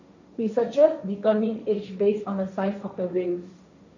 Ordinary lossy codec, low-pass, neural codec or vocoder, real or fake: none; 7.2 kHz; codec, 16 kHz, 1.1 kbps, Voila-Tokenizer; fake